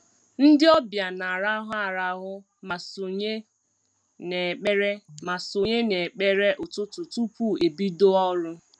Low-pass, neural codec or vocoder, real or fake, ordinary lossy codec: 9.9 kHz; none; real; none